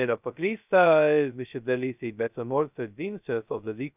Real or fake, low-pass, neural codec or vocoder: fake; 3.6 kHz; codec, 16 kHz, 0.2 kbps, FocalCodec